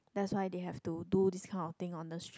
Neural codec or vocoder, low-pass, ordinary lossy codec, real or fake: none; none; none; real